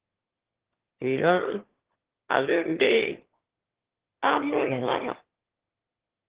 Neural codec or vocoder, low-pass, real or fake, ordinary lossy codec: autoencoder, 22.05 kHz, a latent of 192 numbers a frame, VITS, trained on one speaker; 3.6 kHz; fake; Opus, 16 kbps